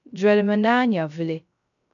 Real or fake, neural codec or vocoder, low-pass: fake; codec, 16 kHz, 0.3 kbps, FocalCodec; 7.2 kHz